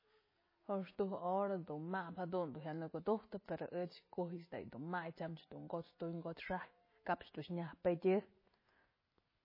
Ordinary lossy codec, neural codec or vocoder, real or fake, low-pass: MP3, 24 kbps; none; real; 5.4 kHz